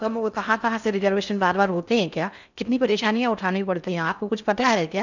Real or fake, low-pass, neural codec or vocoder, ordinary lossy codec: fake; 7.2 kHz; codec, 16 kHz in and 24 kHz out, 0.6 kbps, FocalCodec, streaming, 4096 codes; none